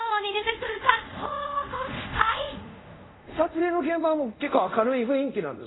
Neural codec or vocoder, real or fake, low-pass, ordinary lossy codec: codec, 24 kHz, 0.5 kbps, DualCodec; fake; 7.2 kHz; AAC, 16 kbps